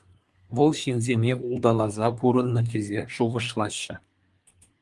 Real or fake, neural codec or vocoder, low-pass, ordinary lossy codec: fake; codec, 24 kHz, 1 kbps, SNAC; 10.8 kHz; Opus, 32 kbps